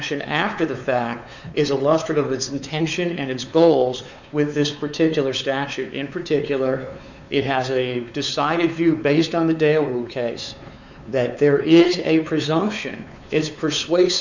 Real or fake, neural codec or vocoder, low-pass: fake; codec, 16 kHz, 4 kbps, X-Codec, WavLM features, trained on Multilingual LibriSpeech; 7.2 kHz